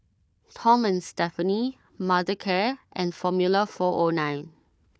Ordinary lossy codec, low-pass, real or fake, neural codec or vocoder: none; none; fake; codec, 16 kHz, 4 kbps, FunCodec, trained on Chinese and English, 50 frames a second